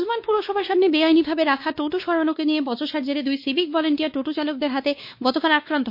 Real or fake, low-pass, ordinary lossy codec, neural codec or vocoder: fake; 5.4 kHz; MP3, 32 kbps; codec, 16 kHz, 2 kbps, X-Codec, WavLM features, trained on Multilingual LibriSpeech